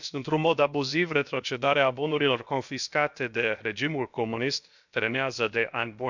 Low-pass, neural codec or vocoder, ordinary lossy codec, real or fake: 7.2 kHz; codec, 16 kHz, about 1 kbps, DyCAST, with the encoder's durations; none; fake